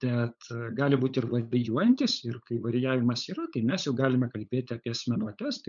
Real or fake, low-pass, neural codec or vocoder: fake; 7.2 kHz; codec, 16 kHz, 8 kbps, FunCodec, trained on LibriTTS, 25 frames a second